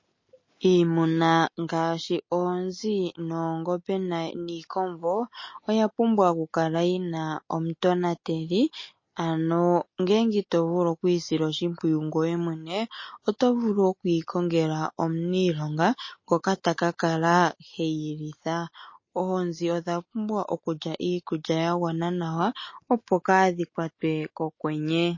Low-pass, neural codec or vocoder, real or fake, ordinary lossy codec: 7.2 kHz; none; real; MP3, 32 kbps